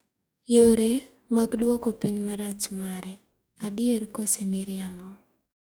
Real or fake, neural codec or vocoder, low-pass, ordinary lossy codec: fake; codec, 44.1 kHz, 2.6 kbps, DAC; none; none